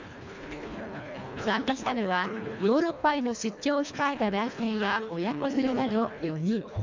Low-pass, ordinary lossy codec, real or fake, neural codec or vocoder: 7.2 kHz; MP3, 64 kbps; fake; codec, 24 kHz, 1.5 kbps, HILCodec